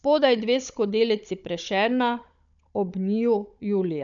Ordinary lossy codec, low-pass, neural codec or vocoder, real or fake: none; 7.2 kHz; codec, 16 kHz, 16 kbps, FunCodec, trained on Chinese and English, 50 frames a second; fake